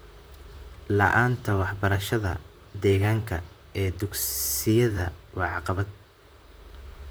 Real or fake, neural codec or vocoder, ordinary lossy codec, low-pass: fake; vocoder, 44.1 kHz, 128 mel bands, Pupu-Vocoder; none; none